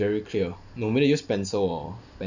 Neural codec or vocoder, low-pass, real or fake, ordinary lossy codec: none; 7.2 kHz; real; none